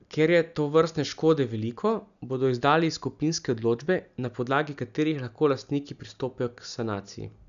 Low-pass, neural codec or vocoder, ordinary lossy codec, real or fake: 7.2 kHz; none; none; real